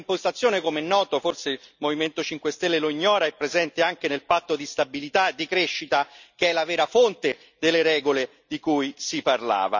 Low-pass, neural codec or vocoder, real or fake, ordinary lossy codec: 7.2 kHz; none; real; none